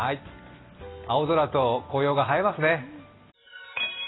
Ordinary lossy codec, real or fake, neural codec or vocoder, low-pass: AAC, 16 kbps; real; none; 7.2 kHz